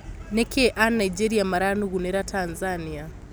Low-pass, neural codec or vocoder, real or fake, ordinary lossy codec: none; none; real; none